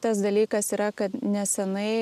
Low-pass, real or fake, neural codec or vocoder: 14.4 kHz; real; none